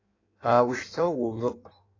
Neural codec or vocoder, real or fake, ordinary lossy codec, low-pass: codec, 16 kHz in and 24 kHz out, 1.1 kbps, FireRedTTS-2 codec; fake; AAC, 32 kbps; 7.2 kHz